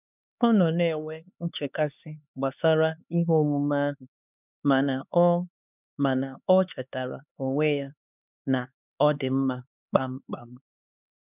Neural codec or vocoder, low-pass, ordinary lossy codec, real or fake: codec, 16 kHz, 4 kbps, X-Codec, HuBERT features, trained on LibriSpeech; 3.6 kHz; none; fake